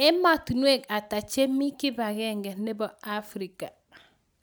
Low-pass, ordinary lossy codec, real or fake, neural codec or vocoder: none; none; real; none